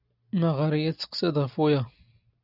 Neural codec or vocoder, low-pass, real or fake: none; 5.4 kHz; real